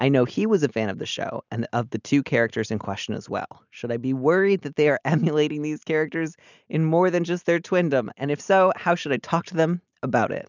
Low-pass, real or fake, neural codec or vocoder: 7.2 kHz; real; none